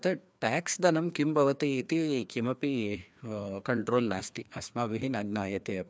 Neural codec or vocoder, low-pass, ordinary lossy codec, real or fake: codec, 16 kHz, 2 kbps, FreqCodec, larger model; none; none; fake